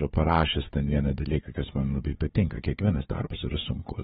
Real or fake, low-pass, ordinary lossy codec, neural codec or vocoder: fake; 7.2 kHz; AAC, 16 kbps; codec, 16 kHz, 4 kbps, FunCodec, trained on Chinese and English, 50 frames a second